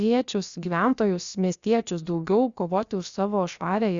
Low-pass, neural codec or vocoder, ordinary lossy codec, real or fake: 7.2 kHz; codec, 16 kHz, about 1 kbps, DyCAST, with the encoder's durations; Opus, 64 kbps; fake